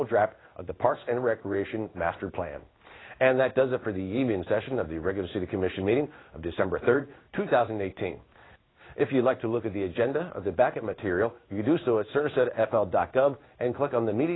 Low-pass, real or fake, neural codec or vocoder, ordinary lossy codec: 7.2 kHz; fake; codec, 16 kHz in and 24 kHz out, 1 kbps, XY-Tokenizer; AAC, 16 kbps